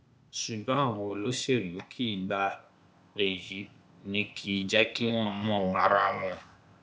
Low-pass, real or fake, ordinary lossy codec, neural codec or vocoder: none; fake; none; codec, 16 kHz, 0.8 kbps, ZipCodec